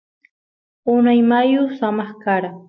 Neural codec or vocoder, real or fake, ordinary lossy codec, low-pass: none; real; MP3, 48 kbps; 7.2 kHz